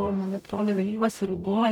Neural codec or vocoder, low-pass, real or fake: codec, 44.1 kHz, 0.9 kbps, DAC; 19.8 kHz; fake